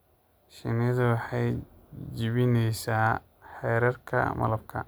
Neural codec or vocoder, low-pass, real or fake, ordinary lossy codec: none; none; real; none